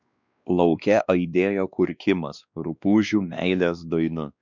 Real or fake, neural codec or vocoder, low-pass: fake; codec, 16 kHz, 2 kbps, X-Codec, WavLM features, trained on Multilingual LibriSpeech; 7.2 kHz